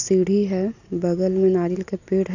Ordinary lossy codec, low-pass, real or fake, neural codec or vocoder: none; 7.2 kHz; real; none